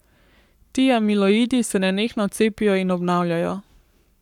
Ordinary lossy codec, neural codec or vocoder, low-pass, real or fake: none; codec, 44.1 kHz, 7.8 kbps, Pupu-Codec; 19.8 kHz; fake